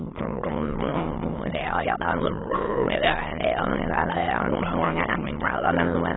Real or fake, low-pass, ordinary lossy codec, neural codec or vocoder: fake; 7.2 kHz; AAC, 16 kbps; autoencoder, 22.05 kHz, a latent of 192 numbers a frame, VITS, trained on many speakers